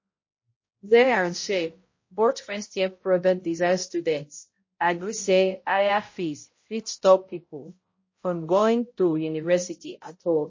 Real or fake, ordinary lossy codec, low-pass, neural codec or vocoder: fake; MP3, 32 kbps; 7.2 kHz; codec, 16 kHz, 0.5 kbps, X-Codec, HuBERT features, trained on balanced general audio